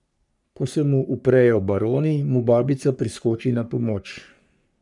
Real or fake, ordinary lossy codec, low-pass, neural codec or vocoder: fake; none; 10.8 kHz; codec, 44.1 kHz, 3.4 kbps, Pupu-Codec